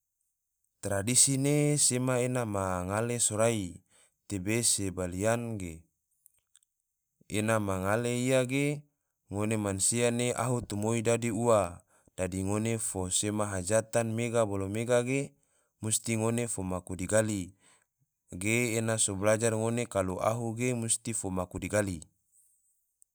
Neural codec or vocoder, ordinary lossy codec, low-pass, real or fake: none; none; none; real